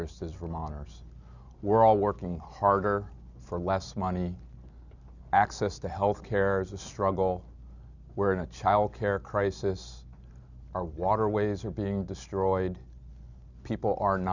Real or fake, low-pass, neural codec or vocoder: real; 7.2 kHz; none